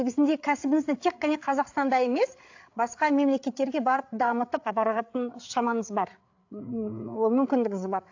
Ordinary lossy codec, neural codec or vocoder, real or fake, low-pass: AAC, 48 kbps; codec, 16 kHz, 8 kbps, FreqCodec, larger model; fake; 7.2 kHz